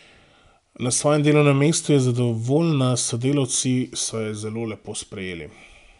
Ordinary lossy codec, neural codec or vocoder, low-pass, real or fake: none; none; 10.8 kHz; real